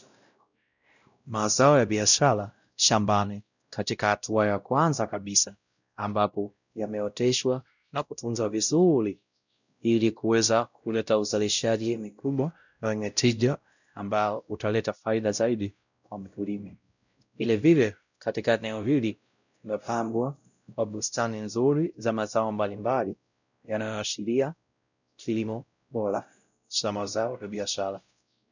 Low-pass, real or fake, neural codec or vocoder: 7.2 kHz; fake; codec, 16 kHz, 0.5 kbps, X-Codec, WavLM features, trained on Multilingual LibriSpeech